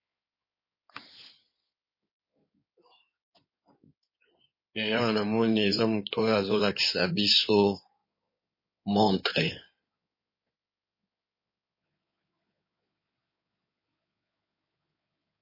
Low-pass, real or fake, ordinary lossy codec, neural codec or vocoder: 5.4 kHz; fake; MP3, 24 kbps; codec, 16 kHz in and 24 kHz out, 2.2 kbps, FireRedTTS-2 codec